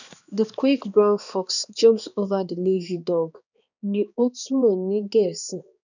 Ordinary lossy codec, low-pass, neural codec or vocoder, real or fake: none; 7.2 kHz; codec, 16 kHz, 2 kbps, X-Codec, HuBERT features, trained on balanced general audio; fake